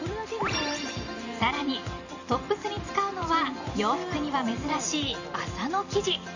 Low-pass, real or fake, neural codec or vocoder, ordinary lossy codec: 7.2 kHz; fake; vocoder, 44.1 kHz, 128 mel bands every 512 samples, BigVGAN v2; none